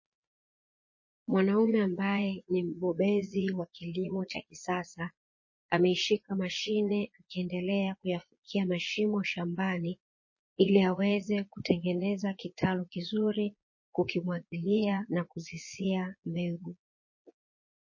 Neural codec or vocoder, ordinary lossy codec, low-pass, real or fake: vocoder, 22.05 kHz, 80 mel bands, Vocos; MP3, 32 kbps; 7.2 kHz; fake